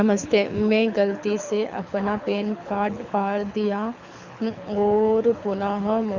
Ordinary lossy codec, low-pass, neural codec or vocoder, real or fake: Opus, 64 kbps; 7.2 kHz; codec, 24 kHz, 6 kbps, HILCodec; fake